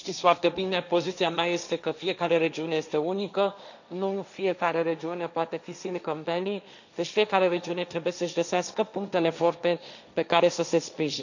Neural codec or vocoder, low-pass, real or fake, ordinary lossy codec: codec, 16 kHz, 1.1 kbps, Voila-Tokenizer; 7.2 kHz; fake; none